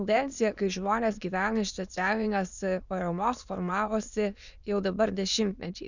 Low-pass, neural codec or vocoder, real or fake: 7.2 kHz; autoencoder, 22.05 kHz, a latent of 192 numbers a frame, VITS, trained on many speakers; fake